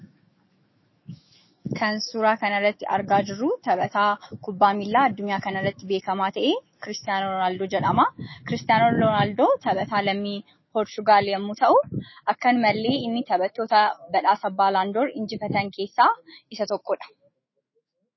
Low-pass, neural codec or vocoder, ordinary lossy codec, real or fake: 7.2 kHz; autoencoder, 48 kHz, 128 numbers a frame, DAC-VAE, trained on Japanese speech; MP3, 24 kbps; fake